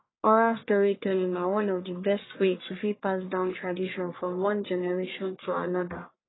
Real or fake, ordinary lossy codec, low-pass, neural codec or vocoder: fake; AAC, 16 kbps; 7.2 kHz; codec, 44.1 kHz, 1.7 kbps, Pupu-Codec